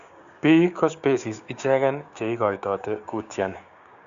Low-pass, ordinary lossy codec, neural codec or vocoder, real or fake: 7.2 kHz; Opus, 64 kbps; codec, 16 kHz, 6 kbps, DAC; fake